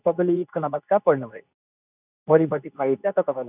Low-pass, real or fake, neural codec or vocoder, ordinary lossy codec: 3.6 kHz; fake; codec, 24 kHz, 0.9 kbps, WavTokenizer, medium speech release version 2; none